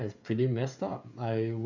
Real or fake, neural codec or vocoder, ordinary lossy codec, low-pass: fake; codec, 16 kHz, 16 kbps, FreqCodec, smaller model; none; 7.2 kHz